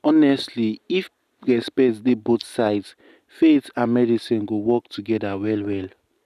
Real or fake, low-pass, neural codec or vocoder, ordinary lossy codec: real; 14.4 kHz; none; none